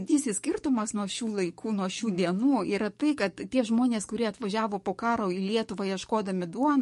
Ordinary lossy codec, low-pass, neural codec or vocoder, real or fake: MP3, 48 kbps; 14.4 kHz; codec, 44.1 kHz, 7.8 kbps, DAC; fake